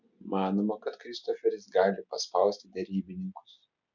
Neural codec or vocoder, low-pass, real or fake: none; 7.2 kHz; real